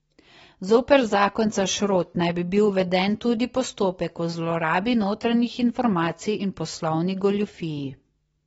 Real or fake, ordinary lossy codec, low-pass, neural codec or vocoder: real; AAC, 24 kbps; 19.8 kHz; none